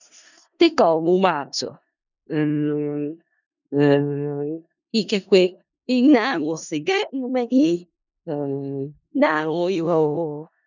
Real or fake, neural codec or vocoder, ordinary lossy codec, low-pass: fake; codec, 16 kHz in and 24 kHz out, 0.4 kbps, LongCat-Audio-Codec, four codebook decoder; none; 7.2 kHz